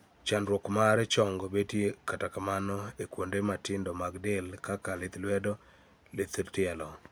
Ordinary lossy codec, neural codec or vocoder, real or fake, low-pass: none; none; real; none